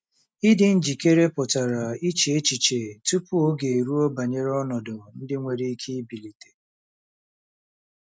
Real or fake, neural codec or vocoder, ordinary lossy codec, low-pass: real; none; none; none